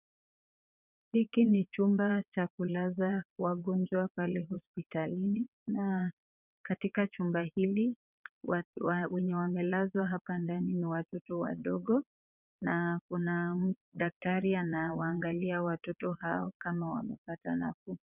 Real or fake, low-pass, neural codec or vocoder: fake; 3.6 kHz; vocoder, 22.05 kHz, 80 mel bands, WaveNeXt